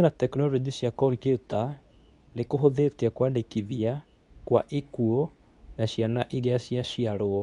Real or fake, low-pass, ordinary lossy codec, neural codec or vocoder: fake; 10.8 kHz; none; codec, 24 kHz, 0.9 kbps, WavTokenizer, medium speech release version 2